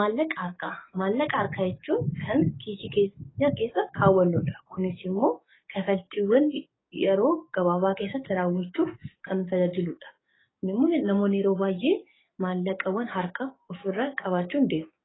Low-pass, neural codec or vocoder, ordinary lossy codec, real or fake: 7.2 kHz; codec, 44.1 kHz, 7.8 kbps, DAC; AAC, 16 kbps; fake